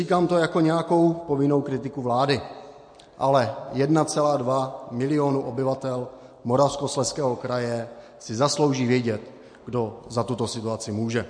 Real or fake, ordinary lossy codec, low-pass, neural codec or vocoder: real; MP3, 48 kbps; 9.9 kHz; none